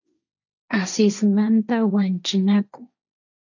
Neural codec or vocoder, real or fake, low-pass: codec, 16 kHz, 1.1 kbps, Voila-Tokenizer; fake; 7.2 kHz